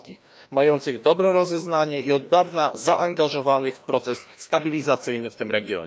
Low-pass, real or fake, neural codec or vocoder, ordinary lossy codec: none; fake; codec, 16 kHz, 1 kbps, FreqCodec, larger model; none